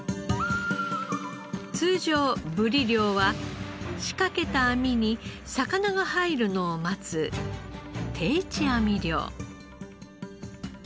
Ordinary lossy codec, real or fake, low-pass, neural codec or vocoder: none; real; none; none